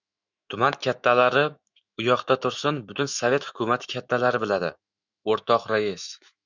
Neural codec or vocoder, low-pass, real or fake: autoencoder, 48 kHz, 128 numbers a frame, DAC-VAE, trained on Japanese speech; 7.2 kHz; fake